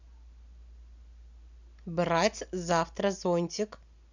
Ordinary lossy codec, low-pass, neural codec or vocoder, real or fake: none; 7.2 kHz; none; real